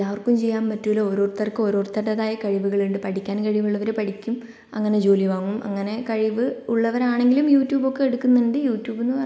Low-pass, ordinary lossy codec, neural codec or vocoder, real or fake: none; none; none; real